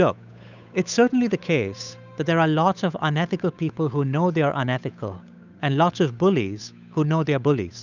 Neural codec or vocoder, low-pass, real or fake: codec, 16 kHz, 8 kbps, FunCodec, trained on Chinese and English, 25 frames a second; 7.2 kHz; fake